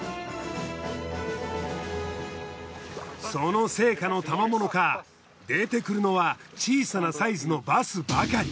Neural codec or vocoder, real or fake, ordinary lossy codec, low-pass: none; real; none; none